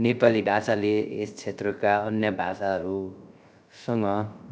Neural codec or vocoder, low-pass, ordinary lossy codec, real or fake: codec, 16 kHz, 0.3 kbps, FocalCodec; none; none; fake